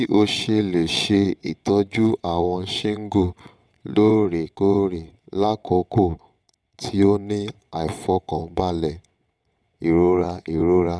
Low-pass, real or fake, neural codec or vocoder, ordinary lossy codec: none; fake; vocoder, 22.05 kHz, 80 mel bands, WaveNeXt; none